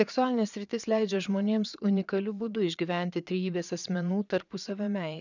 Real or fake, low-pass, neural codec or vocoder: fake; 7.2 kHz; vocoder, 22.05 kHz, 80 mel bands, Vocos